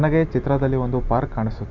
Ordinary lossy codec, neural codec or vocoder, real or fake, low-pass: none; none; real; 7.2 kHz